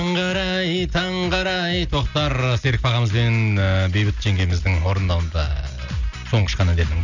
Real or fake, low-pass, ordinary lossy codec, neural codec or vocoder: real; 7.2 kHz; none; none